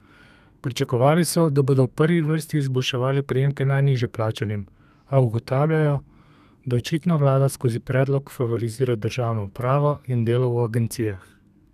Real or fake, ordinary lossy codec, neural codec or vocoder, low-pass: fake; none; codec, 32 kHz, 1.9 kbps, SNAC; 14.4 kHz